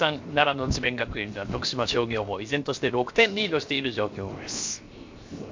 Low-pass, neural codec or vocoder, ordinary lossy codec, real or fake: 7.2 kHz; codec, 16 kHz, 0.7 kbps, FocalCodec; MP3, 48 kbps; fake